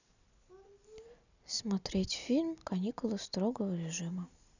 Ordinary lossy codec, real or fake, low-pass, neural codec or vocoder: none; real; 7.2 kHz; none